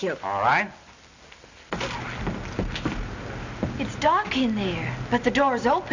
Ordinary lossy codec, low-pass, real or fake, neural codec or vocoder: Opus, 64 kbps; 7.2 kHz; real; none